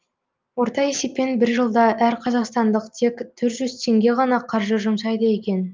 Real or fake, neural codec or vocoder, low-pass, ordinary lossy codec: real; none; 7.2 kHz; Opus, 24 kbps